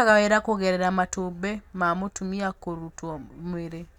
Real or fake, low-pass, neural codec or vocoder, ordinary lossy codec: real; 19.8 kHz; none; none